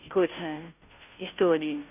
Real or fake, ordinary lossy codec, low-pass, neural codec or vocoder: fake; none; 3.6 kHz; codec, 16 kHz, 0.5 kbps, FunCodec, trained on Chinese and English, 25 frames a second